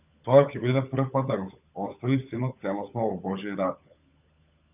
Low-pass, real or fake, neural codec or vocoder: 3.6 kHz; fake; codec, 16 kHz, 16 kbps, FunCodec, trained on LibriTTS, 50 frames a second